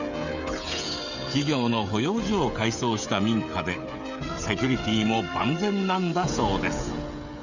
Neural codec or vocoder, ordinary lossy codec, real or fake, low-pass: codec, 16 kHz, 16 kbps, FreqCodec, smaller model; none; fake; 7.2 kHz